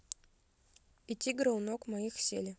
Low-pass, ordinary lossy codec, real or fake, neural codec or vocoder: none; none; real; none